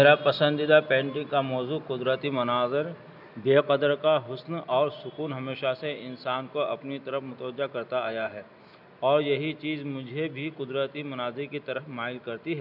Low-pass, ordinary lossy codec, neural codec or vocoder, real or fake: 5.4 kHz; none; none; real